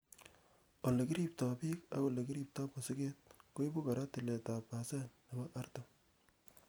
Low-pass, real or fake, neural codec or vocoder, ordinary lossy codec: none; real; none; none